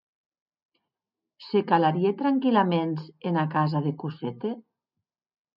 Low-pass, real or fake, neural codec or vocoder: 5.4 kHz; real; none